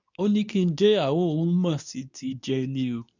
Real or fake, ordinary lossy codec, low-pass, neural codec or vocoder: fake; none; 7.2 kHz; codec, 24 kHz, 0.9 kbps, WavTokenizer, medium speech release version 2